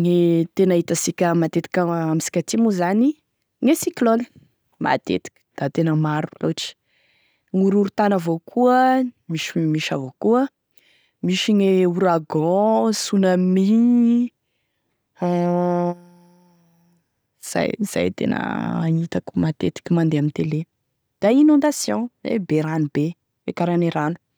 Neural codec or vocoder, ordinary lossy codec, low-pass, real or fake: none; none; none; real